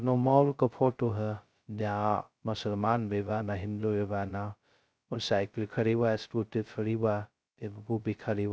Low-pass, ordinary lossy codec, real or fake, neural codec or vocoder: none; none; fake; codec, 16 kHz, 0.2 kbps, FocalCodec